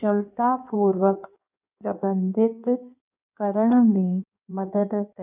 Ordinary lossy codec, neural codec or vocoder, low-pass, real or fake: none; codec, 16 kHz in and 24 kHz out, 1.1 kbps, FireRedTTS-2 codec; 3.6 kHz; fake